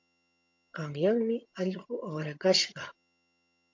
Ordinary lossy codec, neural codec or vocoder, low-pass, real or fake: MP3, 48 kbps; vocoder, 22.05 kHz, 80 mel bands, HiFi-GAN; 7.2 kHz; fake